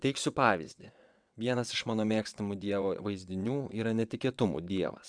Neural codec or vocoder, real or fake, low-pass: vocoder, 22.05 kHz, 80 mel bands, WaveNeXt; fake; 9.9 kHz